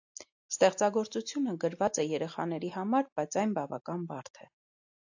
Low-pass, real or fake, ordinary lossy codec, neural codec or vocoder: 7.2 kHz; real; AAC, 48 kbps; none